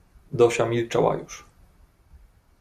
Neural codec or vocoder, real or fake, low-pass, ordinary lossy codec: none; real; 14.4 kHz; Opus, 64 kbps